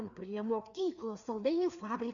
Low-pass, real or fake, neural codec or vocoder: 7.2 kHz; fake; codec, 16 kHz, 2 kbps, FreqCodec, larger model